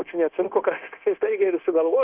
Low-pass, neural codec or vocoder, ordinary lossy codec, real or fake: 3.6 kHz; codec, 16 kHz, 0.9 kbps, LongCat-Audio-Codec; Opus, 24 kbps; fake